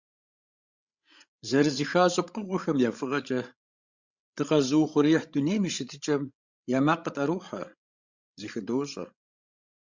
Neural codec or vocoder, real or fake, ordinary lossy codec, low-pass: codec, 16 kHz, 16 kbps, FreqCodec, larger model; fake; Opus, 64 kbps; 7.2 kHz